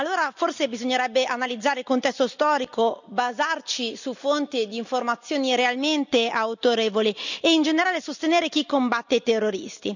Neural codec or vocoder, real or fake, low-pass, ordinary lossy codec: none; real; 7.2 kHz; none